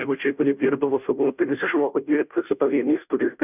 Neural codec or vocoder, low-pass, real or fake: codec, 16 kHz, 0.5 kbps, FunCodec, trained on Chinese and English, 25 frames a second; 3.6 kHz; fake